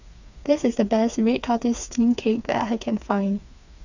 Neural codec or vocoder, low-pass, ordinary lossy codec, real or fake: codec, 16 kHz, 4 kbps, FreqCodec, smaller model; 7.2 kHz; none; fake